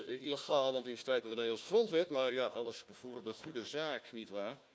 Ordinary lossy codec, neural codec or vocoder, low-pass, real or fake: none; codec, 16 kHz, 1 kbps, FunCodec, trained on Chinese and English, 50 frames a second; none; fake